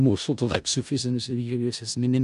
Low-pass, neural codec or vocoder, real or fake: 10.8 kHz; codec, 16 kHz in and 24 kHz out, 0.4 kbps, LongCat-Audio-Codec, four codebook decoder; fake